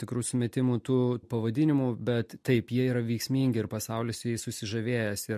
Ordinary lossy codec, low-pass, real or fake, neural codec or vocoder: MP3, 64 kbps; 14.4 kHz; real; none